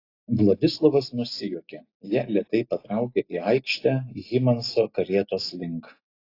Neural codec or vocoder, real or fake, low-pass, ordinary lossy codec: none; real; 5.4 kHz; AAC, 32 kbps